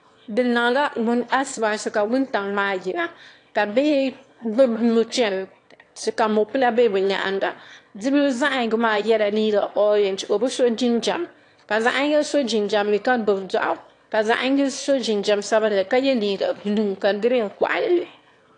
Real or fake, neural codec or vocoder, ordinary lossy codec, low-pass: fake; autoencoder, 22.05 kHz, a latent of 192 numbers a frame, VITS, trained on one speaker; AAC, 48 kbps; 9.9 kHz